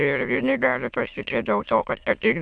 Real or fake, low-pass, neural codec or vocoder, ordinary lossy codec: fake; 9.9 kHz; autoencoder, 22.05 kHz, a latent of 192 numbers a frame, VITS, trained on many speakers; MP3, 64 kbps